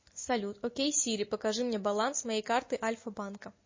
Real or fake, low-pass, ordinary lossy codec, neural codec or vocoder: real; 7.2 kHz; MP3, 32 kbps; none